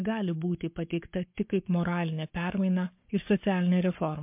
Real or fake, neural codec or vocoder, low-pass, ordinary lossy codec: fake; codec, 44.1 kHz, 7.8 kbps, Pupu-Codec; 3.6 kHz; MP3, 32 kbps